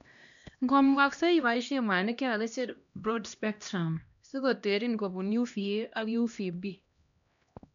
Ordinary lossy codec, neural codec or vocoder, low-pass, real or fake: none; codec, 16 kHz, 1 kbps, X-Codec, HuBERT features, trained on LibriSpeech; 7.2 kHz; fake